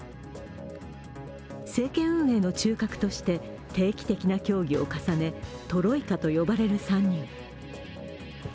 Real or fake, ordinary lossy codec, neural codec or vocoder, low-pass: real; none; none; none